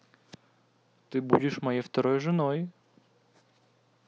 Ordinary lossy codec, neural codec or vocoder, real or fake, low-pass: none; none; real; none